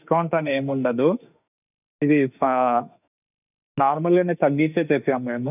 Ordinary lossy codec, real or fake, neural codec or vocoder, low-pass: none; fake; autoencoder, 48 kHz, 32 numbers a frame, DAC-VAE, trained on Japanese speech; 3.6 kHz